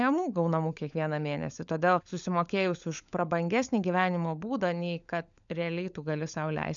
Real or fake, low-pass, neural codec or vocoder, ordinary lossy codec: fake; 7.2 kHz; codec, 16 kHz, 16 kbps, FunCodec, trained on LibriTTS, 50 frames a second; MP3, 96 kbps